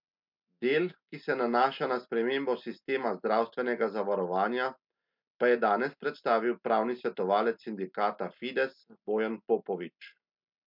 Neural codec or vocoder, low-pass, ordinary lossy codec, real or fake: none; 5.4 kHz; MP3, 48 kbps; real